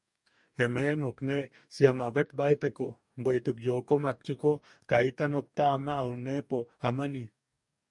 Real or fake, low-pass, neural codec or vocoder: fake; 10.8 kHz; codec, 44.1 kHz, 2.6 kbps, DAC